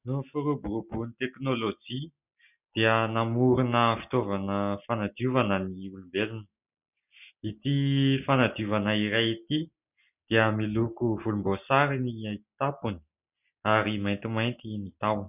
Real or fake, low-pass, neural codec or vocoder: real; 3.6 kHz; none